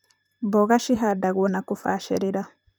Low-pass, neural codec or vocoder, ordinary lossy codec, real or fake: none; vocoder, 44.1 kHz, 128 mel bands, Pupu-Vocoder; none; fake